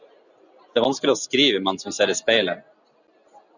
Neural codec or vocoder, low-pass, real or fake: none; 7.2 kHz; real